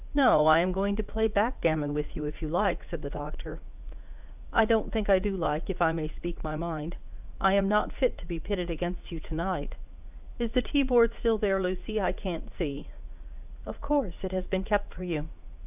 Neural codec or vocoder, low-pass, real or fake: vocoder, 44.1 kHz, 80 mel bands, Vocos; 3.6 kHz; fake